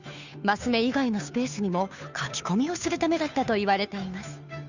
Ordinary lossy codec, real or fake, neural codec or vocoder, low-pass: none; fake; codec, 16 kHz, 2 kbps, FunCodec, trained on Chinese and English, 25 frames a second; 7.2 kHz